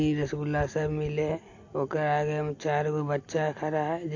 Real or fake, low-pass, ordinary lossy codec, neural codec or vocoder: real; 7.2 kHz; AAC, 48 kbps; none